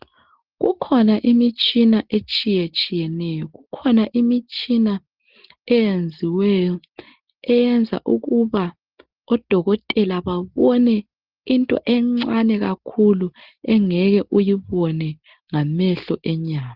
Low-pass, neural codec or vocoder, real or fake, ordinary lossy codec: 5.4 kHz; none; real; Opus, 16 kbps